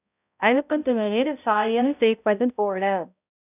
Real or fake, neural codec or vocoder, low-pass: fake; codec, 16 kHz, 0.5 kbps, X-Codec, HuBERT features, trained on balanced general audio; 3.6 kHz